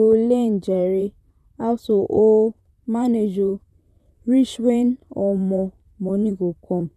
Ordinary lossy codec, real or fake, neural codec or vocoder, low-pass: none; fake; vocoder, 44.1 kHz, 128 mel bands, Pupu-Vocoder; 14.4 kHz